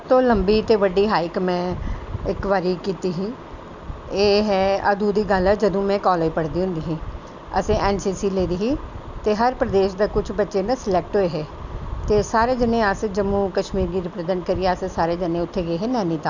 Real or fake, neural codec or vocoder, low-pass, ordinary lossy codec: real; none; 7.2 kHz; none